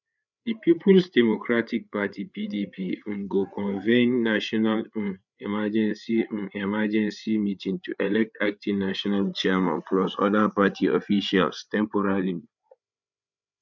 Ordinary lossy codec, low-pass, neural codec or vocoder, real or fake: none; 7.2 kHz; codec, 16 kHz, 8 kbps, FreqCodec, larger model; fake